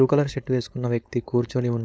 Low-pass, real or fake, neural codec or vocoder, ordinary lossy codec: none; fake; codec, 16 kHz, 4.8 kbps, FACodec; none